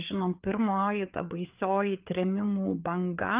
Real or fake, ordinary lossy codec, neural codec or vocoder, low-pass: fake; Opus, 24 kbps; codec, 16 kHz, 4 kbps, FreqCodec, larger model; 3.6 kHz